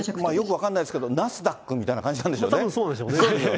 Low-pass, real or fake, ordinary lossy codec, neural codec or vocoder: none; real; none; none